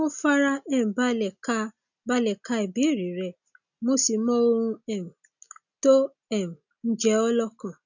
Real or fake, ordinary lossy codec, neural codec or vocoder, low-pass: real; none; none; 7.2 kHz